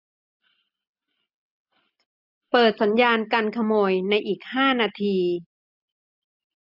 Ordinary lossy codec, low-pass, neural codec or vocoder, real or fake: none; 5.4 kHz; none; real